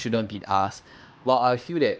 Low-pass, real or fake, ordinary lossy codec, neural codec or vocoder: none; fake; none; codec, 16 kHz, 2 kbps, X-Codec, HuBERT features, trained on LibriSpeech